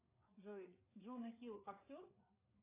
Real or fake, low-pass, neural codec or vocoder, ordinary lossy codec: fake; 3.6 kHz; codec, 16 kHz, 4 kbps, FreqCodec, larger model; AAC, 24 kbps